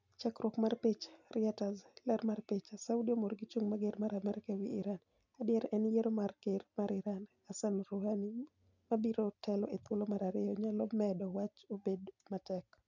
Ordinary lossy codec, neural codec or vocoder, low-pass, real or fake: none; none; 7.2 kHz; real